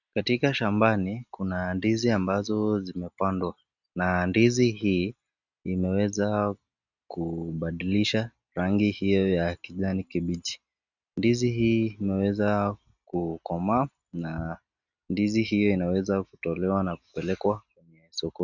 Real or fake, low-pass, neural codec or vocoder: real; 7.2 kHz; none